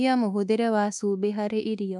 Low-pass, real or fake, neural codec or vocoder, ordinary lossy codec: none; fake; codec, 24 kHz, 1.2 kbps, DualCodec; none